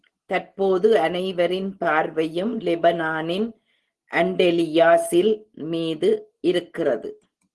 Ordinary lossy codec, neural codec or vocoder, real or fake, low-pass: Opus, 16 kbps; none; real; 10.8 kHz